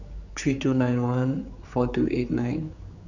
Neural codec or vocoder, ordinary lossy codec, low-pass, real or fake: codec, 16 kHz, 4 kbps, X-Codec, HuBERT features, trained on general audio; none; 7.2 kHz; fake